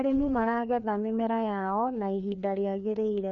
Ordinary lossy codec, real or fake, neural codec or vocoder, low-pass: none; fake; codec, 16 kHz, 2 kbps, FreqCodec, larger model; 7.2 kHz